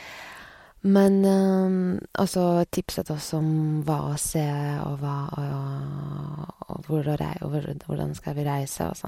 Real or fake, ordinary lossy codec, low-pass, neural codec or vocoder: real; MP3, 64 kbps; 19.8 kHz; none